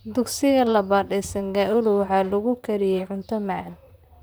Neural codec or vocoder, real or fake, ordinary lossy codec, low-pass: vocoder, 44.1 kHz, 128 mel bands, Pupu-Vocoder; fake; none; none